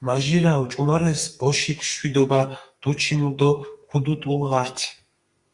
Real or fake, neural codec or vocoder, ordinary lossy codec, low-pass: fake; codec, 32 kHz, 1.9 kbps, SNAC; Opus, 64 kbps; 10.8 kHz